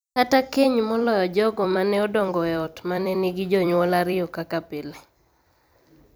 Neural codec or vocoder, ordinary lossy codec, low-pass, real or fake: vocoder, 44.1 kHz, 128 mel bands every 256 samples, BigVGAN v2; none; none; fake